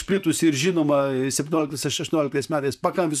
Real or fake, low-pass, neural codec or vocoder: fake; 14.4 kHz; vocoder, 44.1 kHz, 128 mel bands, Pupu-Vocoder